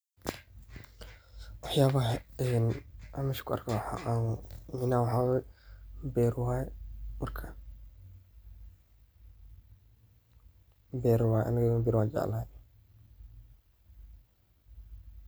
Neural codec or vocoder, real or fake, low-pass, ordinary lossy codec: none; real; none; none